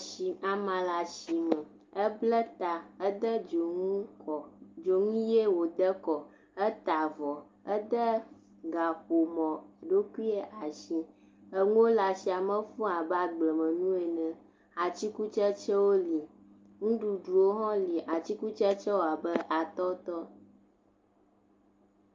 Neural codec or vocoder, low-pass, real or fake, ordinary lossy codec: none; 7.2 kHz; real; Opus, 24 kbps